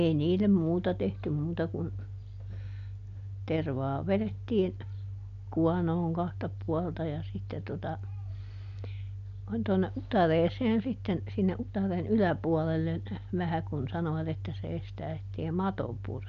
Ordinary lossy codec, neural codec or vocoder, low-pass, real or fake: none; none; 7.2 kHz; real